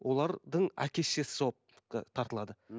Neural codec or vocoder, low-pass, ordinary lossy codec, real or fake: none; none; none; real